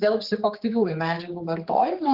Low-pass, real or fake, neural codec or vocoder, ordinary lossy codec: 5.4 kHz; fake; codec, 16 kHz, 4 kbps, X-Codec, HuBERT features, trained on general audio; Opus, 16 kbps